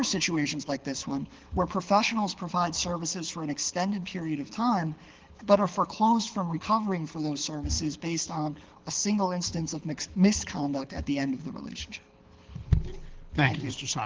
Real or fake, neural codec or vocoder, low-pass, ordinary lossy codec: fake; codec, 24 kHz, 6 kbps, HILCodec; 7.2 kHz; Opus, 16 kbps